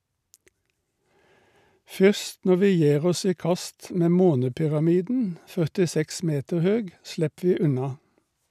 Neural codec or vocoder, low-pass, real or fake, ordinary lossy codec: none; 14.4 kHz; real; none